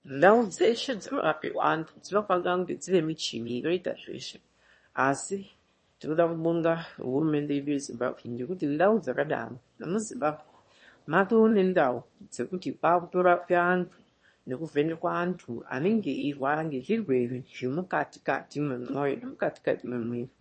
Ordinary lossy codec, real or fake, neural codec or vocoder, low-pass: MP3, 32 kbps; fake; autoencoder, 22.05 kHz, a latent of 192 numbers a frame, VITS, trained on one speaker; 9.9 kHz